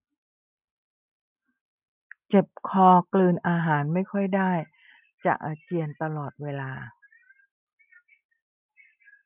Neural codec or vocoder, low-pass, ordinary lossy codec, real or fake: none; 3.6 kHz; none; real